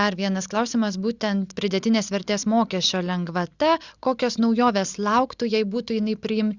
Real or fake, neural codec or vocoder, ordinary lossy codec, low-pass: real; none; Opus, 64 kbps; 7.2 kHz